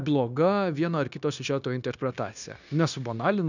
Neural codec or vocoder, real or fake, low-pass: codec, 16 kHz, 0.9 kbps, LongCat-Audio-Codec; fake; 7.2 kHz